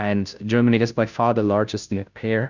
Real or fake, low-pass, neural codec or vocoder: fake; 7.2 kHz; codec, 16 kHz, 0.5 kbps, FunCodec, trained on Chinese and English, 25 frames a second